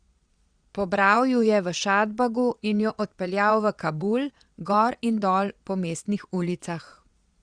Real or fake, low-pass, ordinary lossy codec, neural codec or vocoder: fake; 9.9 kHz; Opus, 64 kbps; vocoder, 22.05 kHz, 80 mel bands, Vocos